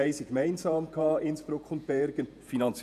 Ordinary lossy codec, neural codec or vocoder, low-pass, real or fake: none; vocoder, 48 kHz, 128 mel bands, Vocos; 14.4 kHz; fake